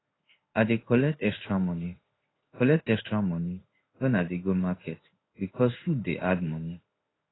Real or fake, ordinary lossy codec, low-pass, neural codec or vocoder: fake; AAC, 16 kbps; 7.2 kHz; codec, 16 kHz in and 24 kHz out, 1 kbps, XY-Tokenizer